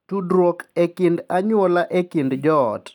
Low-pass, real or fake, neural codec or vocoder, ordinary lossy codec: 19.8 kHz; real; none; none